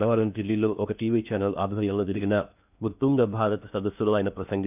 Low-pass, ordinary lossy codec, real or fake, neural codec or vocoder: 3.6 kHz; none; fake; codec, 16 kHz in and 24 kHz out, 0.6 kbps, FocalCodec, streaming, 2048 codes